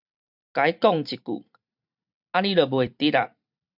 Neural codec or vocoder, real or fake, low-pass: vocoder, 44.1 kHz, 128 mel bands every 512 samples, BigVGAN v2; fake; 5.4 kHz